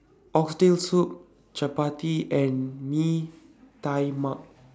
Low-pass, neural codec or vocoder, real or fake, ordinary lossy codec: none; none; real; none